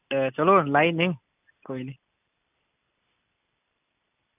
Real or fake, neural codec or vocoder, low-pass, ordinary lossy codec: real; none; 3.6 kHz; none